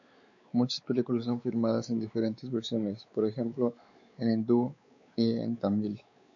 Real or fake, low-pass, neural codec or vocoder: fake; 7.2 kHz; codec, 16 kHz, 4 kbps, X-Codec, WavLM features, trained on Multilingual LibriSpeech